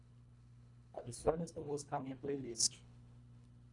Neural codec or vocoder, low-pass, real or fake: codec, 24 kHz, 1.5 kbps, HILCodec; 10.8 kHz; fake